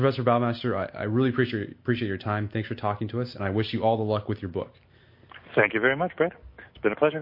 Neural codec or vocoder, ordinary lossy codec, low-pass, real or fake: none; MP3, 32 kbps; 5.4 kHz; real